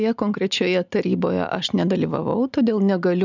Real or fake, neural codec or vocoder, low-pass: real; none; 7.2 kHz